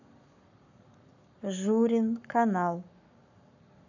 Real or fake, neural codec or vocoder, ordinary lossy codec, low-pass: fake; codec, 44.1 kHz, 7.8 kbps, Pupu-Codec; none; 7.2 kHz